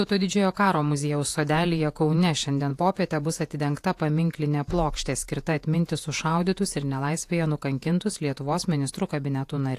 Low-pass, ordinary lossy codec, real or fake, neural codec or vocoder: 14.4 kHz; AAC, 64 kbps; fake; vocoder, 48 kHz, 128 mel bands, Vocos